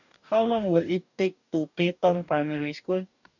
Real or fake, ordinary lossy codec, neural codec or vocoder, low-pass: fake; none; codec, 44.1 kHz, 2.6 kbps, DAC; 7.2 kHz